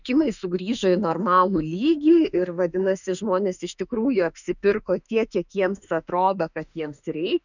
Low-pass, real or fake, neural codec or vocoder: 7.2 kHz; fake; autoencoder, 48 kHz, 32 numbers a frame, DAC-VAE, trained on Japanese speech